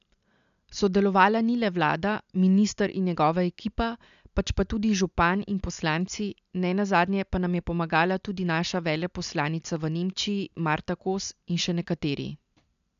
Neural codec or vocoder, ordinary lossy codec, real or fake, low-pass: none; none; real; 7.2 kHz